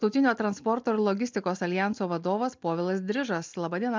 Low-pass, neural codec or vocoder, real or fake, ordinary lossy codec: 7.2 kHz; none; real; MP3, 64 kbps